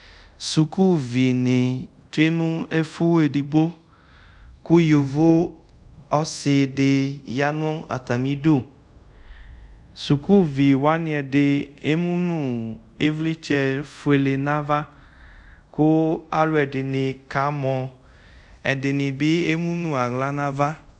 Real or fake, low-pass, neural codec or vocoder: fake; 10.8 kHz; codec, 24 kHz, 0.5 kbps, DualCodec